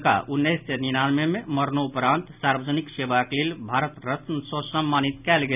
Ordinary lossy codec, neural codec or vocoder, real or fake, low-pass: none; none; real; 3.6 kHz